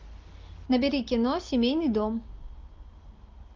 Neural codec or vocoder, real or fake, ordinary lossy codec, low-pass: none; real; Opus, 24 kbps; 7.2 kHz